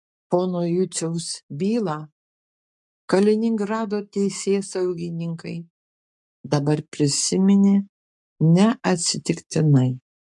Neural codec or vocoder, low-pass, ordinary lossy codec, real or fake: codec, 44.1 kHz, 7.8 kbps, Pupu-Codec; 10.8 kHz; MP3, 64 kbps; fake